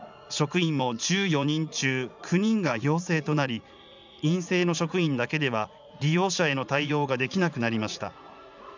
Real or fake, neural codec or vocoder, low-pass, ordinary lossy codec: fake; vocoder, 44.1 kHz, 80 mel bands, Vocos; 7.2 kHz; none